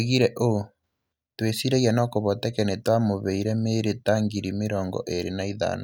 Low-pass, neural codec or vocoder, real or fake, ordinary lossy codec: none; none; real; none